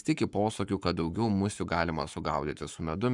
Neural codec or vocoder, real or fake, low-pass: codec, 44.1 kHz, 7.8 kbps, Pupu-Codec; fake; 10.8 kHz